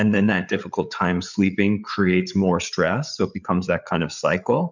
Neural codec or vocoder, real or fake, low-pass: codec, 16 kHz, 8 kbps, FreqCodec, larger model; fake; 7.2 kHz